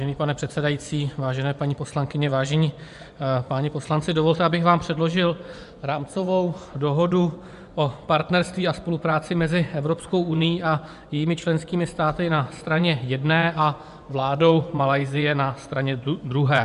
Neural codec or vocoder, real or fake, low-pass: vocoder, 24 kHz, 100 mel bands, Vocos; fake; 10.8 kHz